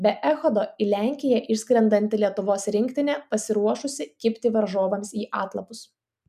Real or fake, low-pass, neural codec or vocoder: real; 14.4 kHz; none